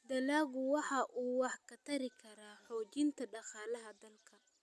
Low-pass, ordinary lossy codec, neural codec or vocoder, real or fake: none; none; none; real